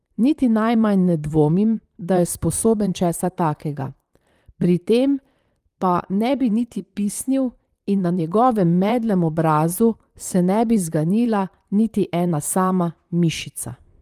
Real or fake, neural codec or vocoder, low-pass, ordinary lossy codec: fake; vocoder, 44.1 kHz, 128 mel bands, Pupu-Vocoder; 14.4 kHz; Opus, 32 kbps